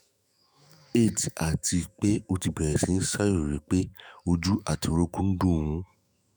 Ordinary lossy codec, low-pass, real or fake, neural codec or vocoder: none; none; fake; autoencoder, 48 kHz, 128 numbers a frame, DAC-VAE, trained on Japanese speech